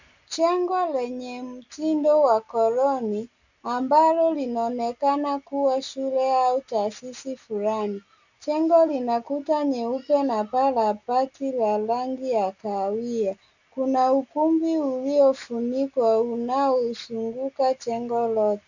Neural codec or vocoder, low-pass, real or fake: none; 7.2 kHz; real